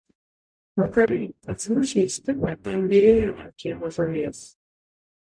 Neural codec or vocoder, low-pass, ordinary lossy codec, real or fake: codec, 44.1 kHz, 0.9 kbps, DAC; 9.9 kHz; MP3, 64 kbps; fake